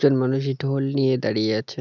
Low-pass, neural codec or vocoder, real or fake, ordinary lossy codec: 7.2 kHz; none; real; none